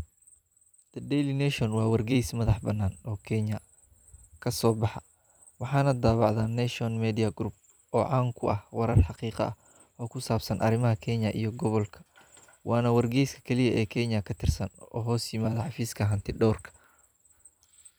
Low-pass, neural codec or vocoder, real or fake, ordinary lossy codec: none; vocoder, 44.1 kHz, 128 mel bands every 256 samples, BigVGAN v2; fake; none